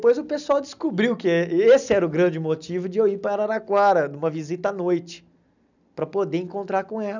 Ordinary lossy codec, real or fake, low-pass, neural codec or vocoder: none; real; 7.2 kHz; none